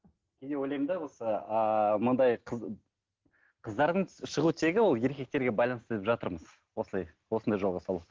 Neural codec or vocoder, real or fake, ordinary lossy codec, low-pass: none; real; Opus, 16 kbps; 7.2 kHz